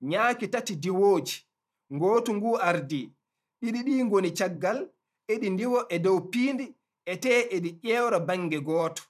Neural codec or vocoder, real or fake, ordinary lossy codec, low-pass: vocoder, 44.1 kHz, 128 mel bands every 512 samples, BigVGAN v2; fake; none; 14.4 kHz